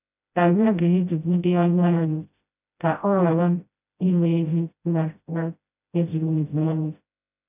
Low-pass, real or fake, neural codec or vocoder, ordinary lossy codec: 3.6 kHz; fake; codec, 16 kHz, 0.5 kbps, FreqCodec, smaller model; none